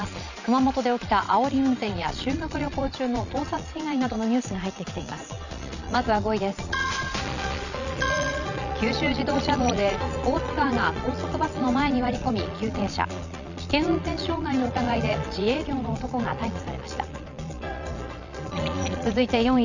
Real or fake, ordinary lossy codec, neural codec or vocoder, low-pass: fake; none; vocoder, 22.05 kHz, 80 mel bands, Vocos; 7.2 kHz